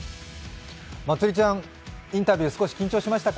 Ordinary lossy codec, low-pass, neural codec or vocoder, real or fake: none; none; none; real